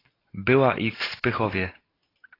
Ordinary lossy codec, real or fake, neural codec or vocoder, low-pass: AAC, 24 kbps; real; none; 5.4 kHz